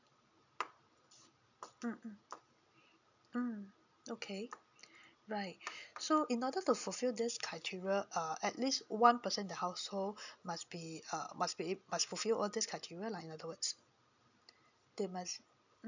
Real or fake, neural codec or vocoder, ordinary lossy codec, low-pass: real; none; none; 7.2 kHz